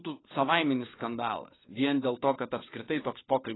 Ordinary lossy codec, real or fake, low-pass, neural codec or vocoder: AAC, 16 kbps; fake; 7.2 kHz; codec, 16 kHz, 4 kbps, FunCodec, trained on LibriTTS, 50 frames a second